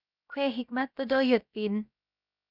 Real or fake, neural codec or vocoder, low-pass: fake; codec, 16 kHz, about 1 kbps, DyCAST, with the encoder's durations; 5.4 kHz